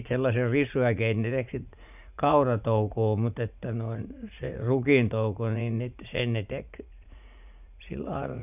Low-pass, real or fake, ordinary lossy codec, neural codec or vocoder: 3.6 kHz; fake; none; vocoder, 44.1 kHz, 80 mel bands, Vocos